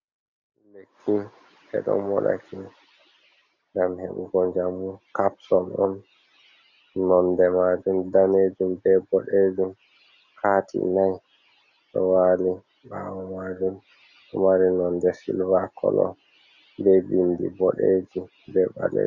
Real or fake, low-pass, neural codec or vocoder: real; 7.2 kHz; none